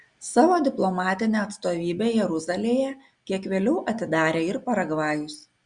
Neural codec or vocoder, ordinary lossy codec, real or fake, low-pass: none; Opus, 64 kbps; real; 9.9 kHz